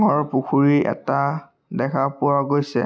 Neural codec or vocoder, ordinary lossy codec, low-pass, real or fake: none; none; none; real